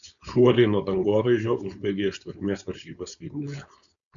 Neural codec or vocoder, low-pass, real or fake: codec, 16 kHz, 4.8 kbps, FACodec; 7.2 kHz; fake